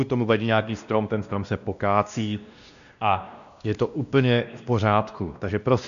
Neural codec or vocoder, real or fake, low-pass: codec, 16 kHz, 1 kbps, X-Codec, WavLM features, trained on Multilingual LibriSpeech; fake; 7.2 kHz